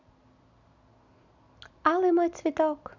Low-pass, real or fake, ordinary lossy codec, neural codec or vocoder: 7.2 kHz; real; none; none